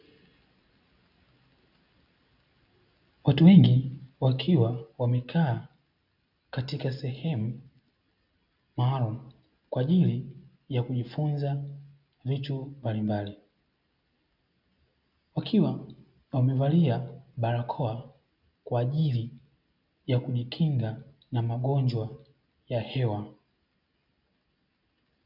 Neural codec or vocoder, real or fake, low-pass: none; real; 5.4 kHz